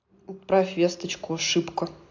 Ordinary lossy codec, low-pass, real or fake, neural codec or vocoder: AAC, 48 kbps; 7.2 kHz; real; none